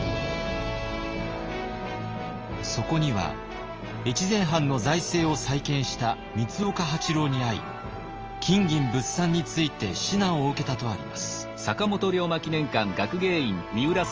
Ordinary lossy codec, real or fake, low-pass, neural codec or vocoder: Opus, 24 kbps; real; 7.2 kHz; none